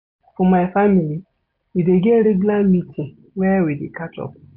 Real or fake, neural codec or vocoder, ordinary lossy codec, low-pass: real; none; MP3, 32 kbps; 5.4 kHz